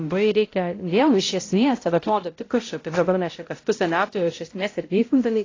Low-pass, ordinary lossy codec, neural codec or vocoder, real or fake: 7.2 kHz; AAC, 32 kbps; codec, 16 kHz, 0.5 kbps, X-Codec, HuBERT features, trained on balanced general audio; fake